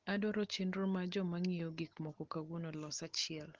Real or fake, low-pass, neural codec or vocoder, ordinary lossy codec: real; 7.2 kHz; none; Opus, 16 kbps